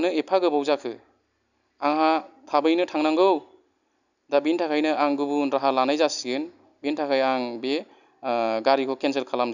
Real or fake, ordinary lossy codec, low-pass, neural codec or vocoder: real; none; 7.2 kHz; none